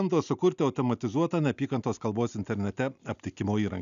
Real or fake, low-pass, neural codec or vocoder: real; 7.2 kHz; none